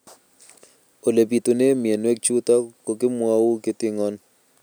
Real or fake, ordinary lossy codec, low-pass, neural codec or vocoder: real; none; none; none